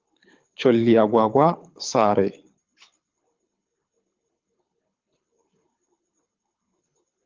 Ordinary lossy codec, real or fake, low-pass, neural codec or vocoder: Opus, 32 kbps; fake; 7.2 kHz; codec, 24 kHz, 6 kbps, HILCodec